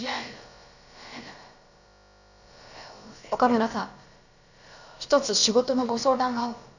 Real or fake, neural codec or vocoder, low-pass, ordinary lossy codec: fake; codec, 16 kHz, about 1 kbps, DyCAST, with the encoder's durations; 7.2 kHz; none